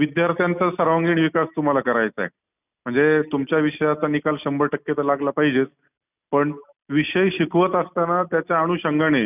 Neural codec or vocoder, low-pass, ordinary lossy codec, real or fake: none; 3.6 kHz; none; real